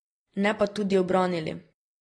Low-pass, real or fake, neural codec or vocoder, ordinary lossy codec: 9.9 kHz; real; none; AAC, 32 kbps